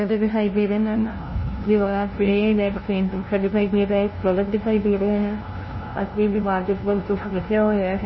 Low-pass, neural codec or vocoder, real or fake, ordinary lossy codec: 7.2 kHz; codec, 16 kHz, 0.5 kbps, FunCodec, trained on LibriTTS, 25 frames a second; fake; MP3, 24 kbps